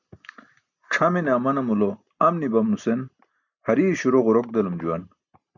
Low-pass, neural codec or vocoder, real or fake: 7.2 kHz; none; real